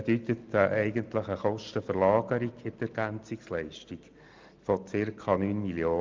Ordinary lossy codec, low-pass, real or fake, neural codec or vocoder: Opus, 24 kbps; 7.2 kHz; real; none